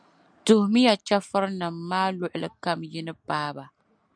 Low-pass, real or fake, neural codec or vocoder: 9.9 kHz; real; none